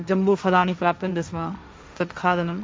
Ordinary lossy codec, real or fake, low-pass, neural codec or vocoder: none; fake; none; codec, 16 kHz, 1.1 kbps, Voila-Tokenizer